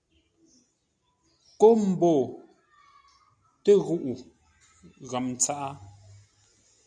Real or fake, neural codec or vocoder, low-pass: real; none; 9.9 kHz